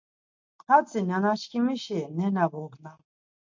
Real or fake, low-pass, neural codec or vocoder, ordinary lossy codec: real; 7.2 kHz; none; MP3, 48 kbps